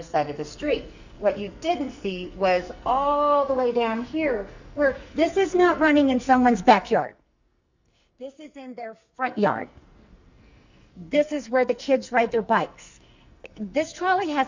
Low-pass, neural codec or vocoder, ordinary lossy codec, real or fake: 7.2 kHz; codec, 44.1 kHz, 2.6 kbps, SNAC; Opus, 64 kbps; fake